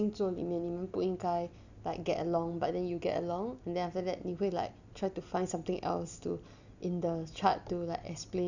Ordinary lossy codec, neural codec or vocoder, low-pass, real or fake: none; none; 7.2 kHz; real